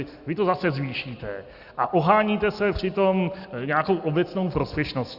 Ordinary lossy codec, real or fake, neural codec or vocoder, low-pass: Opus, 64 kbps; real; none; 5.4 kHz